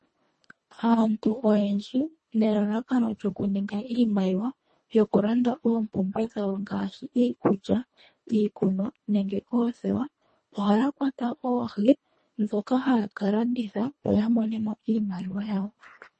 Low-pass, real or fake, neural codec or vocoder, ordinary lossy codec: 10.8 kHz; fake; codec, 24 kHz, 1.5 kbps, HILCodec; MP3, 32 kbps